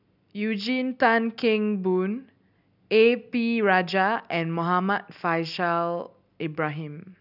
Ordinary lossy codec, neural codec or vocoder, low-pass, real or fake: none; none; 5.4 kHz; real